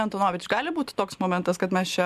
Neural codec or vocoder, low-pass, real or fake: none; 14.4 kHz; real